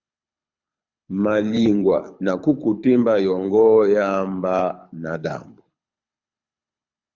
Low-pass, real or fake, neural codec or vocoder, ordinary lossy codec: 7.2 kHz; fake; codec, 24 kHz, 6 kbps, HILCodec; Opus, 64 kbps